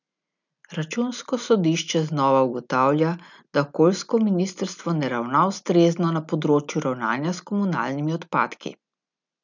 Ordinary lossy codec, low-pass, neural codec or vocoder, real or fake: none; 7.2 kHz; none; real